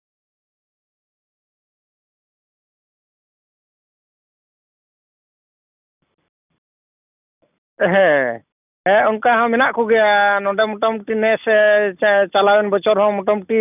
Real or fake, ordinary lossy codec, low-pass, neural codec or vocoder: real; none; 3.6 kHz; none